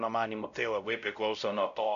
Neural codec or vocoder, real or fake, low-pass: codec, 16 kHz, 0.5 kbps, X-Codec, WavLM features, trained on Multilingual LibriSpeech; fake; 7.2 kHz